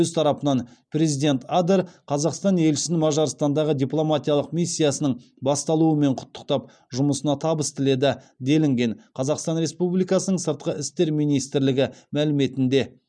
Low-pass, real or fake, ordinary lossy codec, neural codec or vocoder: 9.9 kHz; real; none; none